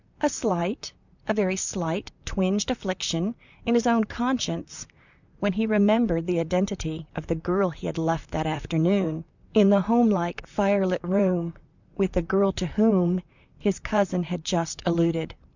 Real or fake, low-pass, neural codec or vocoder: fake; 7.2 kHz; vocoder, 44.1 kHz, 128 mel bands, Pupu-Vocoder